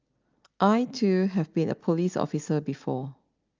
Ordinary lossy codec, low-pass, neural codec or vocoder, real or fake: Opus, 32 kbps; 7.2 kHz; none; real